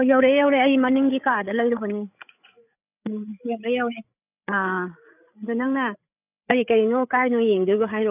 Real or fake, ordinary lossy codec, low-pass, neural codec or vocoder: fake; none; 3.6 kHz; codec, 16 kHz, 8 kbps, FreqCodec, larger model